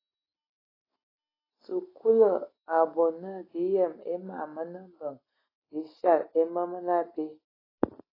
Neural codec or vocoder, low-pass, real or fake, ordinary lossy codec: none; 5.4 kHz; real; AAC, 24 kbps